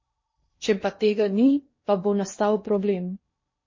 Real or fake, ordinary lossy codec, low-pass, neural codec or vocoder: fake; MP3, 32 kbps; 7.2 kHz; codec, 16 kHz in and 24 kHz out, 0.8 kbps, FocalCodec, streaming, 65536 codes